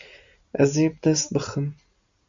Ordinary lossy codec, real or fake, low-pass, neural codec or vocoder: AAC, 64 kbps; real; 7.2 kHz; none